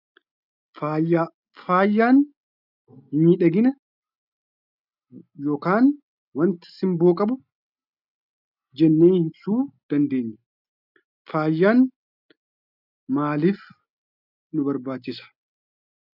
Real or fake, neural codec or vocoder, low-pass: real; none; 5.4 kHz